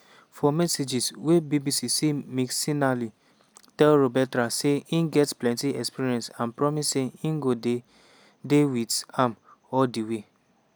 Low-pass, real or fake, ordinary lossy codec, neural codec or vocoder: none; real; none; none